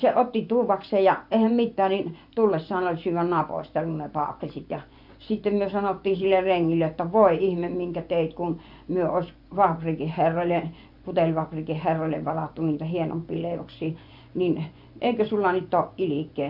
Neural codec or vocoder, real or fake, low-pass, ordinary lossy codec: vocoder, 24 kHz, 100 mel bands, Vocos; fake; 5.4 kHz; AAC, 48 kbps